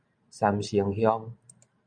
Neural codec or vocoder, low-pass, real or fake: vocoder, 44.1 kHz, 128 mel bands every 512 samples, BigVGAN v2; 9.9 kHz; fake